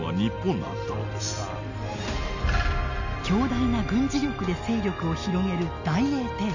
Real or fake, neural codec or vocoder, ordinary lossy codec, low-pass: real; none; none; 7.2 kHz